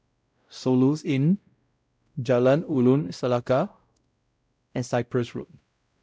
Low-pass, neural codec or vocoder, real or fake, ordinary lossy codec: none; codec, 16 kHz, 0.5 kbps, X-Codec, WavLM features, trained on Multilingual LibriSpeech; fake; none